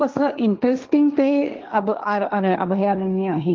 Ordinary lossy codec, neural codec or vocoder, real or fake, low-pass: Opus, 24 kbps; codec, 16 kHz, 1.1 kbps, Voila-Tokenizer; fake; 7.2 kHz